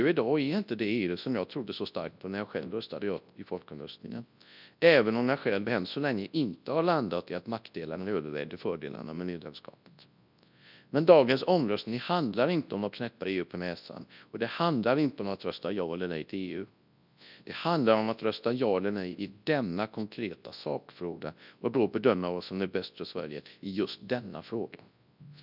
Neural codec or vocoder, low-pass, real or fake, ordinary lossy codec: codec, 24 kHz, 0.9 kbps, WavTokenizer, large speech release; 5.4 kHz; fake; none